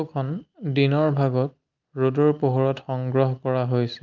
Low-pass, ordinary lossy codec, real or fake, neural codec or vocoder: 7.2 kHz; Opus, 32 kbps; real; none